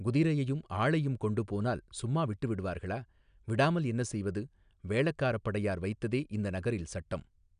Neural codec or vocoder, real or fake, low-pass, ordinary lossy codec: none; real; 9.9 kHz; none